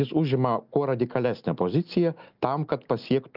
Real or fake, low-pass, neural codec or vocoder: real; 5.4 kHz; none